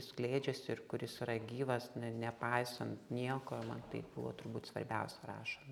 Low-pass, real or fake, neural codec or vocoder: 19.8 kHz; real; none